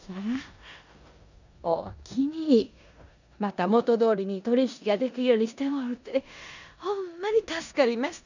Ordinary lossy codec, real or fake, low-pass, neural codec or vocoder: none; fake; 7.2 kHz; codec, 16 kHz in and 24 kHz out, 0.9 kbps, LongCat-Audio-Codec, four codebook decoder